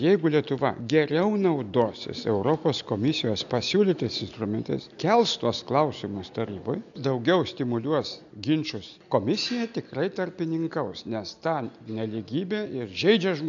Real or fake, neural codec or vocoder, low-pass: real; none; 7.2 kHz